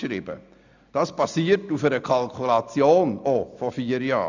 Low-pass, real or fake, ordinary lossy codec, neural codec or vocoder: 7.2 kHz; real; none; none